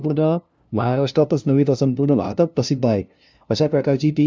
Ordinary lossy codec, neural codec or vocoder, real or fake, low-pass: none; codec, 16 kHz, 0.5 kbps, FunCodec, trained on LibriTTS, 25 frames a second; fake; none